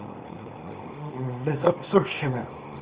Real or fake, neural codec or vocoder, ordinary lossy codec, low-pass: fake; codec, 24 kHz, 0.9 kbps, WavTokenizer, small release; Opus, 24 kbps; 3.6 kHz